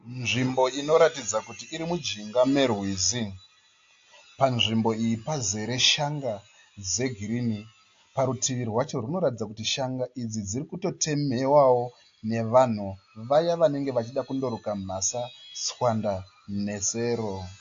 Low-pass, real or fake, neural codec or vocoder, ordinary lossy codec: 7.2 kHz; real; none; AAC, 48 kbps